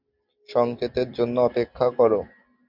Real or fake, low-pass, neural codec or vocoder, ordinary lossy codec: real; 5.4 kHz; none; MP3, 48 kbps